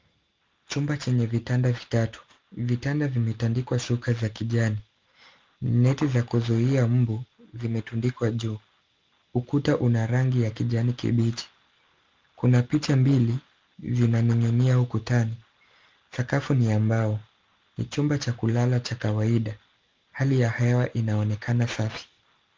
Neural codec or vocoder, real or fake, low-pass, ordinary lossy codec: none; real; 7.2 kHz; Opus, 24 kbps